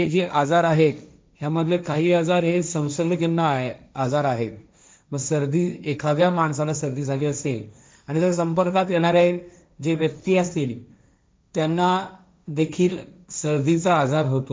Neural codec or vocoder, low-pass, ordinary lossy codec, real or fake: codec, 16 kHz, 1.1 kbps, Voila-Tokenizer; none; none; fake